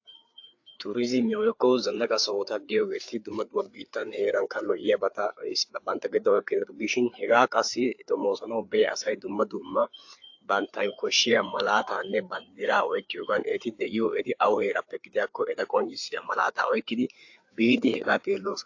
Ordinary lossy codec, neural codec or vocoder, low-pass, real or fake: AAC, 48 kbps; codec, 16 kHz, 4 kbps, FreqCodec, larger model; 7.2 kHz; fake